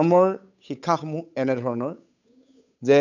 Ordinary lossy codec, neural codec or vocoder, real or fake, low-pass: none; codec, 16 kHz, 8 kbps, FunCodec, trained on Chinese and English, 25 frames a second; fake; 7.2 kHz